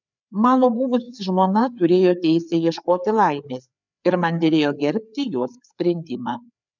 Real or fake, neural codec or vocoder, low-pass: fake; codec, 16 kHz, 4 kbps, FreqCodec, larger model; 7.2 kHz